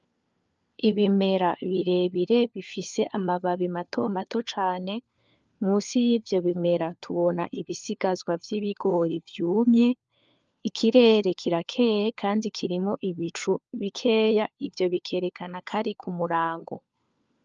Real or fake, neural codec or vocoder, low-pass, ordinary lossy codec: fake; codec, 16 kHz, 4 kbps, FunCodec, trained on LibriTTS, 50 frames a second; 7.2 kHz; Opus, 24 kbps